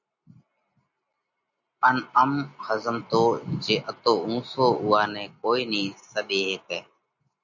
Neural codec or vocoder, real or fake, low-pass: none; real; 7.2 kHz